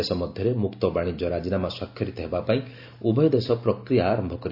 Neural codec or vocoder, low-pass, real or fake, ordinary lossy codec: none; 5.4 kHz; real; none